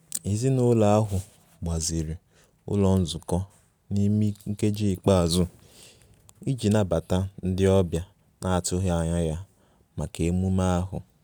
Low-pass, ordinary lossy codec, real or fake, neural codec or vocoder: none; none; real; none